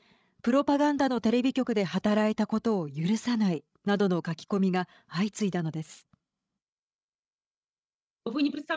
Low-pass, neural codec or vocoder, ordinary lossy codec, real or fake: none; codec, 16 kHz, 16 kbps, FreqCodec, larger model; none; fake